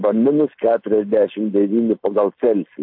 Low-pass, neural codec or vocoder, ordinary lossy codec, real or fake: 5.4 kHz; none; MP3, 32 kbps; real